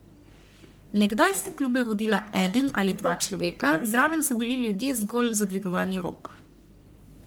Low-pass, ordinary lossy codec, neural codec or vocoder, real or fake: none; none; codec, 44.1 kHz, 1.7 kbps, Pupu-Codec; fake